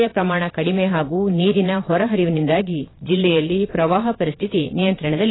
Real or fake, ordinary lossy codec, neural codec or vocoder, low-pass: fake; AAC, 16 kbps; vocoder, 22.05 kHz, 80 mel bands, Vocos; 7.2 kHz